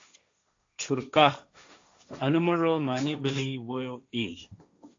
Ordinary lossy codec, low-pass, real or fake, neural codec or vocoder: AAC, 48 kbps; 7.2 kHz; fake; codec, 16 kHz, 1.1 kbps, Voila-Tokenizer